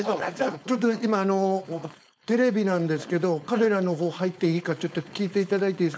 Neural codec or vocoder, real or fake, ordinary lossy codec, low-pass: codec, 16 kHz, 4.8 kbps, FACodec; fake; none; none